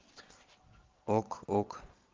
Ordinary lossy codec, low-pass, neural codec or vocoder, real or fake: Opus, 32 kbps; 7.2 kHz; vocoder, 22.05 kHz, 80 mel bands, Vocos; fake